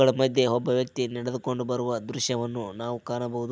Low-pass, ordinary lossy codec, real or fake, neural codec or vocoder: none; none; real; none